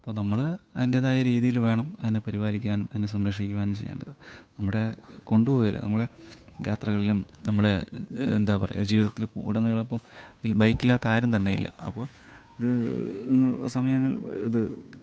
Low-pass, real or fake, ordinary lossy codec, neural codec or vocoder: none; fake; none; codec, 16 kHz, 2 kbps, FunCodec, trained on Chinese and English, 25 frames a second